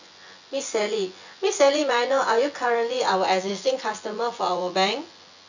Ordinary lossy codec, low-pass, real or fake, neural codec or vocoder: none; 7.2 kHz; fake; vocoder, 24 kHz, 100 mel bands, Vocos